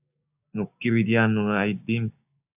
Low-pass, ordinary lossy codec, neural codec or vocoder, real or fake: 3.6 kHz; AAC, 32 kbps; codec, 16 kHz, 6 kbps, DAC; fake